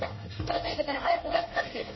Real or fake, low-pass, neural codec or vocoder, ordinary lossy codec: fake; 7.2 kHz; codec, 24 kHz, 1 kbps, SNAC; MP3, 24 kbps